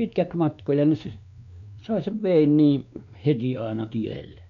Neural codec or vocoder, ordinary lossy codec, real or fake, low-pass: codec, 16 kHz, 2 kbps, X-Codec, WavLM features, trained on Multilingual LibriSpeech; none; fake; 7.2 kHz